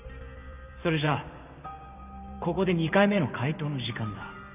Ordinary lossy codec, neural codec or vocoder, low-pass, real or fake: none; vocoder, 44.1 kHz, 128 mel bands every 512 samples, BigVGAN v2; 3.6 kHz; fake